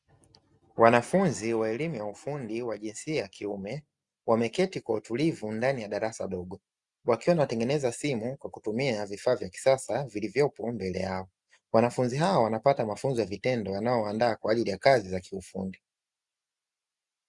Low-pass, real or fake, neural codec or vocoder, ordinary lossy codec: 10.8 kHz; real; none; Opus, 64 kbps